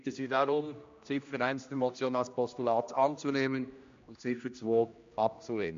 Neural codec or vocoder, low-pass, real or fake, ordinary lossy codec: codec, 16 kHz, 1 kbps, X-Codec, HuBERT features, trained on general audio; 7.2 kHz; fake; MP3, 48 kbps